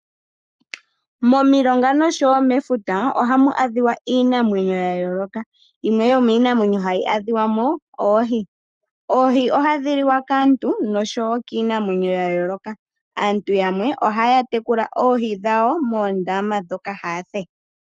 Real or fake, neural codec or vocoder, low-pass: fake; codec, 44.1 kHz, 7.8 kbps, Pupu-Codec; 10.8 kHz